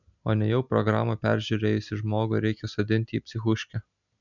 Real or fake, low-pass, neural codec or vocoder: real; 7.2 kHz; none